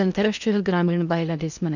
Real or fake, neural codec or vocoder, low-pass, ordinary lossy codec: fake; codec, 16 kHz in and 24 kHz out, 0.8 kbps, FocalCodec, streaming, 65536 codes; 7.2 kHz; none